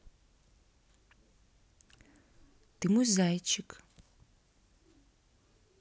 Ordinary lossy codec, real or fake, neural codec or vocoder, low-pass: none; real; none; none